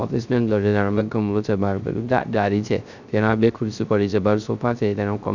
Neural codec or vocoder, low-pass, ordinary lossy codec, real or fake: codec, 16 kHz, 0.3 kbps, FocalCodec; 7.2 kHz; none; fake